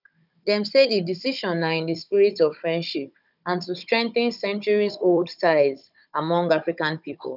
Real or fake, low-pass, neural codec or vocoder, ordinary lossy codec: fake; 5.4 kHz; codec, 16 kHz, 16 kbps, FunCodec, trained on Chinese and English, 50 frames a second; none